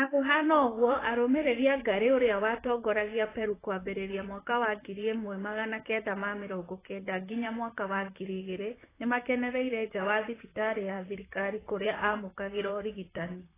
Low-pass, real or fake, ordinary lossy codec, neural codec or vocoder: 3.6 kHz; fake; AAC, 16 kbps; vocoder, 44.1 kHz, 128 mel bands, Pupu-Vocoder